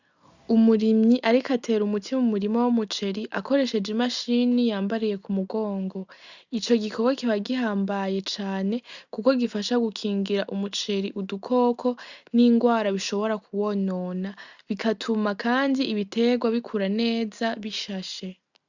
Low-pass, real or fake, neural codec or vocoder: 7.2 kHz; real; none